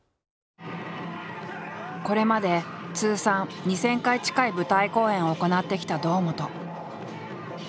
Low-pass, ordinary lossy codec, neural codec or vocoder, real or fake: none; none; none; real